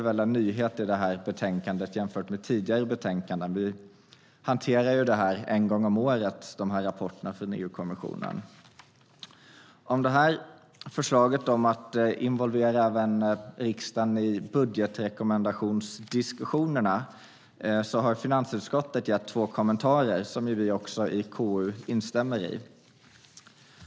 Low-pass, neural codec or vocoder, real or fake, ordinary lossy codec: none; none; real; none